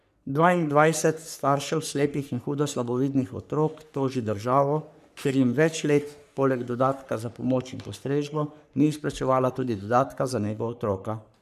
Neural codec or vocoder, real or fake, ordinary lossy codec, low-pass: codec, 44.1 kHz, 3.4 kbps, Pupu-Codec; fake; none; 14.4 kHz